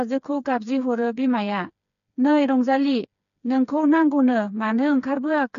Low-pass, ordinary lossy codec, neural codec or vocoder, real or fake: 7.2 kHz; none; codec, 16 kHz, 4 kbps, FreqCodec, smaller model; fake